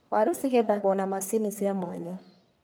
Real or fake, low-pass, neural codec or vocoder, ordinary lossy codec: fake; none; codec, 44.1 kHz, 1.7 kbps, Pupu-Codec; none